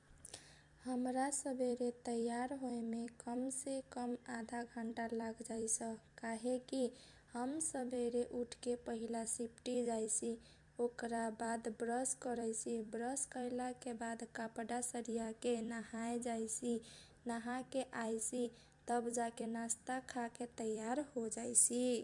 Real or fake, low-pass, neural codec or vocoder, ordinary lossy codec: fake; 10.8 kHz; vocoder, 44.1 kHz, 128 mel bands every 256 samples, BigVGAN v2; MP3, 64 kbps